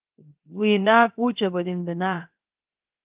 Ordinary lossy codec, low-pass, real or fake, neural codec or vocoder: Opus, 32 kbps; 3.6 kHz; fake; codec, 16 kHz, 0.7 kbps, FocalCodec